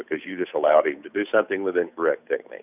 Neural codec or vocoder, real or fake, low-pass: codec, 16 kHz, 8 kbps, FunCodec, trained on Chinese and English, 25 frames a second; fake; 3.6 kHz